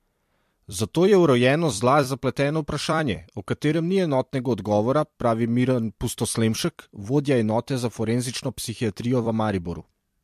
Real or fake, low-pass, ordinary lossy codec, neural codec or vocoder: fake; 14.4 kHz; MP3, 64 kbps; vocoder, 44.1 kHz, 128 mel bands every 256 samples, BigVGAN v2